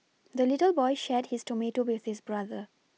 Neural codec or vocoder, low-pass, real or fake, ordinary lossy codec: none; none; real; none